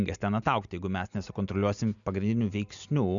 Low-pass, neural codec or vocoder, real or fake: 7.2 kHz; none; real